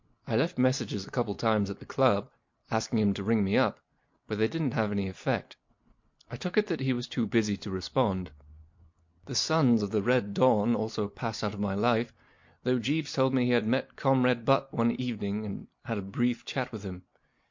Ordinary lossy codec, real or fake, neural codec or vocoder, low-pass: MP3, 48 kbps; real; none; 7.2 kHz